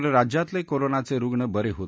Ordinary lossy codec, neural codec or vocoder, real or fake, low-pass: none; none; real; none